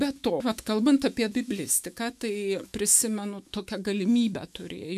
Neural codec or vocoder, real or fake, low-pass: autoencoder, 48 kHz, 128 numbers a frame, DAC-VAE, trained on Japanese speech; fake; 14.4 kHz